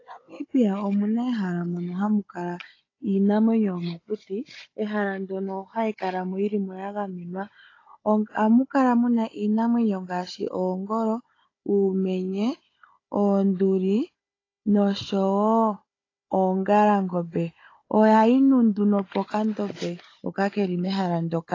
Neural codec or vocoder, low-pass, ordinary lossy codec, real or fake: codec, 16 kHz, 16 kbps, FunCodec, trained on Chinese and English, 50 frames a second; 7.2 kHz; AAC, 32 kbps; fake